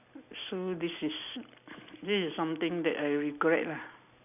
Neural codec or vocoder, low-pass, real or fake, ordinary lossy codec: none; 3.6 kHz; real; none